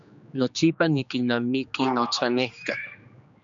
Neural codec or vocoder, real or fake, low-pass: codec, 16 kHz, 2 kbps, X-Codec, HuBERT features, trained on general audio; fake; 7.2 kHz